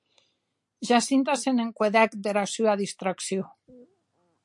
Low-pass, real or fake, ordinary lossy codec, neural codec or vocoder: 10.8 kHz; real; MP3, 64 kbps; none